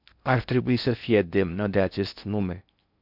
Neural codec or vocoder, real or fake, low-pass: codec, 16 kHz in and 24 kHz out, 0.6 kbps, FocalCodec, streaming, 4096 codes; fake; 5.4 kHz